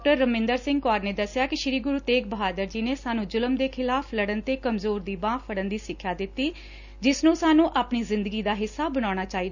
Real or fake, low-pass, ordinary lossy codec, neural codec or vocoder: real; 7.2 kHz; none; none